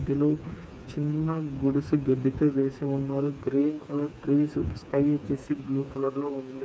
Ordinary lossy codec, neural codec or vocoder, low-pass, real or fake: none; codec, 16 kHz, 4 kbps, FreqCodec, smaller model; none; fake